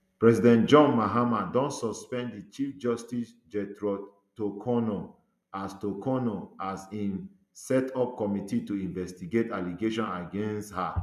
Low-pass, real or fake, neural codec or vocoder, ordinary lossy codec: 14.4 kHz; real; none; none